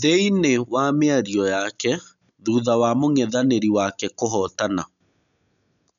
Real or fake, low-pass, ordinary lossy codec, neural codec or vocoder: real; 7.2 kHz; none; none